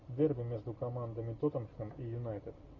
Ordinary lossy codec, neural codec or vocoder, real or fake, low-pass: AAC, 48 kbps; none; real; 7.2 kHz